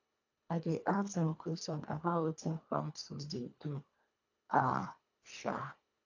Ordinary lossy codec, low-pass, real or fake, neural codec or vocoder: AAC, 48 kbps; 7.2 kHz; fake; codec, 24 kHz, 1.5 kbps, HILCodec